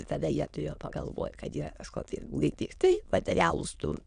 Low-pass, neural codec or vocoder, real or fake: 9.9 kHz; autoencoder, 22.05 kHz, a latent of 192 numbers a frame, VITS, trained on many speakers; fake